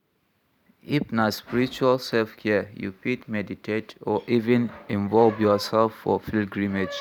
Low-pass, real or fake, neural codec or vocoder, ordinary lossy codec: 19.8 kHz; fake; vocoder, 44.1 kHz, 128 mel bands every 512 samples, BigVGAN v2; none